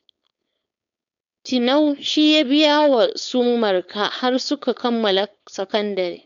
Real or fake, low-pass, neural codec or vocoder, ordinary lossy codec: fake; 7.2 kHz; codec, 16 kHz, 4.8 kbps, FACodec; none